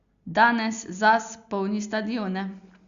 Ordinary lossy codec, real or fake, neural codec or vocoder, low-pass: Opus, 64 kbps; real; none; 7.2 kHz